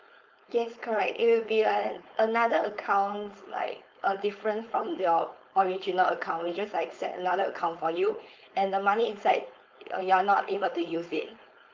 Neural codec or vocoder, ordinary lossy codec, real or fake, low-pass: codec, 16 kHz, 4.8 kbps, FACodec; Opus, 24 kbps; fake; 7.2 kHz